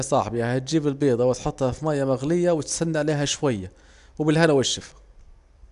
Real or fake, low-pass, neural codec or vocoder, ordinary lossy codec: real; 10.8 kHz; none; AAC, 96 kbps